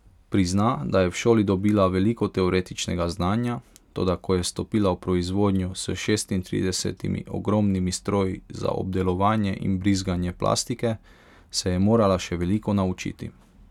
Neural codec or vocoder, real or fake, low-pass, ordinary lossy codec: none; real; 19.8 kHz; none